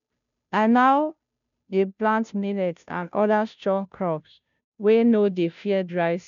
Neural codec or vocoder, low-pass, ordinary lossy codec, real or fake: codec, 16 kHz, 0.5 kbps, FunCodec, trained on Chinese and English, 25 frames a second; 7.2 kHz; none; fake